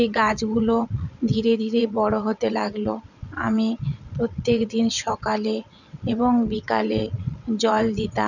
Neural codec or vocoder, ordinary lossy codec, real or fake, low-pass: vocoder, 22.05 kHz, 80 mel bands, WaveNeXt; none; fake; 7.2 kHz